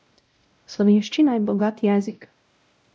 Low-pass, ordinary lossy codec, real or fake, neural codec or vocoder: none; none; fake; codec, 16 kHz, 0.5 kbps, X-Codec, WavLM features, trained on Multilingual LibriSpeech